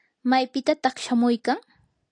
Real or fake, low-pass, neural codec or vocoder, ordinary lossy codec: real; 9.9 kHz; none; AAC, 48 kbps